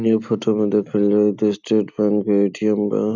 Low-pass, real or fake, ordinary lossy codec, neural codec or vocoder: 7.2 kHz; real; none; none